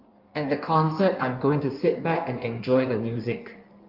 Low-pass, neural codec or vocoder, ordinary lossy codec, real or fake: 5.4 kHz; codec, 16 kHz in and 24 kHz out, 1.1 kbps, FireRedTTS-2 codec; Opus, 24 kbps; fake